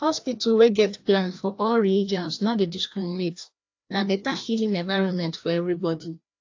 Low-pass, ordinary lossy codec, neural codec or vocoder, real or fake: 7.2 kHz; AAC, 48 kbps; codec, 16 kHz, 1 kbps, FreqCodec, larger model; fake